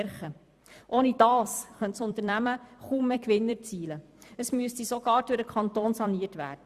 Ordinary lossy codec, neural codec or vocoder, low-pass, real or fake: Opus, 64 kbps; vocoder, 44.1 kHz, 128 mel bands every 256 samples, BigVGAN v2; 14.4 kHz; fake